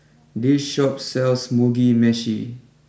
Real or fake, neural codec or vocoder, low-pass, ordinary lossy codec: real; none; none; none